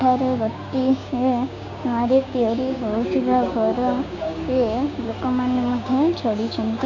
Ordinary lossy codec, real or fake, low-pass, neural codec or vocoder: MP3, 48 kbps; fake; 7.2 kHz; codec, 16 kHz, 6 kbps, DAC